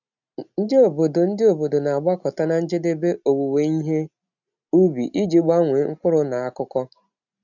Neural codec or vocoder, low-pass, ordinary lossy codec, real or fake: none; 7.2 kHz; none; real